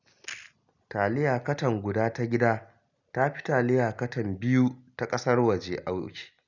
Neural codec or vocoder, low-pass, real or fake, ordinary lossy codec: none; 7.2 kHz; real; none